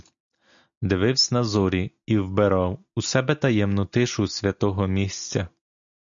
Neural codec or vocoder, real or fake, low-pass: none; real; 7.2 kHz